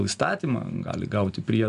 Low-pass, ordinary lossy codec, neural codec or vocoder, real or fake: 10.8 kHz; MP3, 96 kbps; none; real